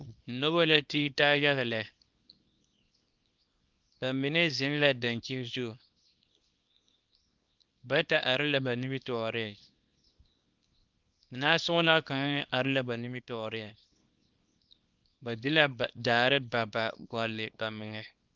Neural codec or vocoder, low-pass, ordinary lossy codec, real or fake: codec, 24 kHz, 0.9 kbps, WavTokenizer, small release; 7.2 kHz; Opus, 24 kbps; fake